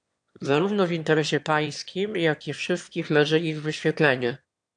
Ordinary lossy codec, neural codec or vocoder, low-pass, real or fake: AAC, 64 kbps; autoencoder, 22.05 kHz, a latent of 192 numbers a frame, VITS, trained on one speaker; 9.9 kHz; fake